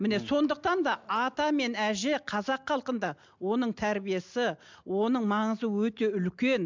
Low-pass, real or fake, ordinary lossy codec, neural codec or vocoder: 7.2 kHz; real; MP3, 64 kbps; none